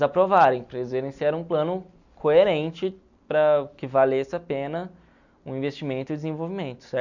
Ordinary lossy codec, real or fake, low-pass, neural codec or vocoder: none; real; 7.2 kHz; none